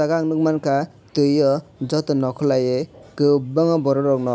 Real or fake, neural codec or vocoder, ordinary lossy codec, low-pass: real; none; none; none